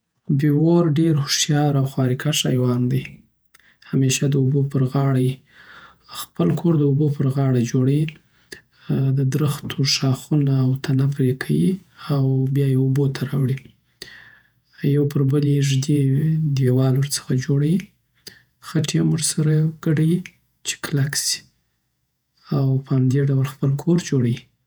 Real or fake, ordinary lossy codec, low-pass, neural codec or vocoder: fake; none; none; vocoder, 48 kHz, 128 mel bands, Vocos